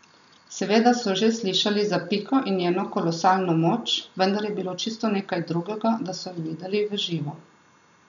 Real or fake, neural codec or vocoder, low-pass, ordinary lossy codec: real; none; 7.2 kHz; none